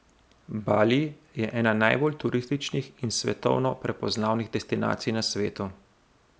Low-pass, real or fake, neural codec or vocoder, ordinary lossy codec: none; real; none; none